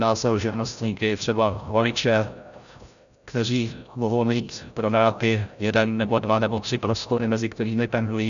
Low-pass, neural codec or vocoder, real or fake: 7.2 kHz; codec, 16 kHz, 0.5 kbps, FreqCodec, larger model; fake